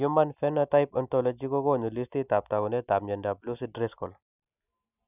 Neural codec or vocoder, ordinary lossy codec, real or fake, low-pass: none; none; real; 3.6 kHz